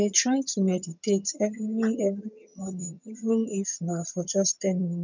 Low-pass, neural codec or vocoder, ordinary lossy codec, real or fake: 7.2 kHz; vocoder, 22.05 kHz, 80 mel bands, HiFi-GAN; none; fake